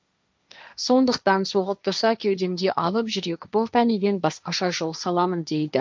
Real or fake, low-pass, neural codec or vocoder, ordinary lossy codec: fake; none; codec, 16 kHz, 1.1 kbps, Voila-Tokenizer; none